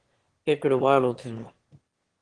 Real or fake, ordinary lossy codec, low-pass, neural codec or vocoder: fake; Opus, 16 kbps; 9.9 kHz; autoencoder, 22.05 kHz, a latent of 192 numbers a frame, VITS, trained on one speaker